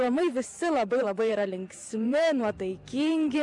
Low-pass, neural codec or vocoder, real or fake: 10.8 kHz; vocoder, 44.1 kHz, 128 mel bands every 512 samples, BigVGAN v2; fake